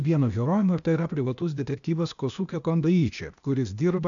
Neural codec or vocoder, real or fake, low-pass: codec, 16 kHz, 0.8 kbps, ZipCodec; fake; 7.2 kHz